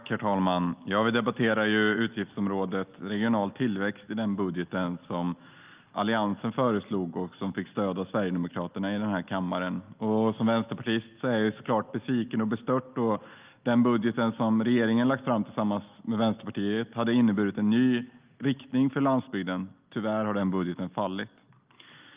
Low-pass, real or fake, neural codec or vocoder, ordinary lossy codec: 3.6 kHz; real; none; Opus, 24 kbps